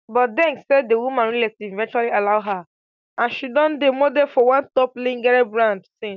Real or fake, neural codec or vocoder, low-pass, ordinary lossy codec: real; none; 7.2 kHz; none